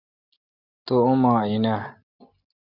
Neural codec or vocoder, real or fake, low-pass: none; real; 5.4 kHz